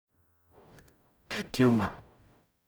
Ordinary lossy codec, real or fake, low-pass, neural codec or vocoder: none; fake; none; codec, 44.1 kHz, 0.9 kbps, DAC